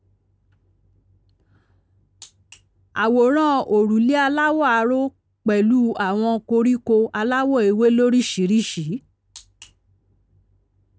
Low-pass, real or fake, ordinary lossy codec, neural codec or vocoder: none; real; none; none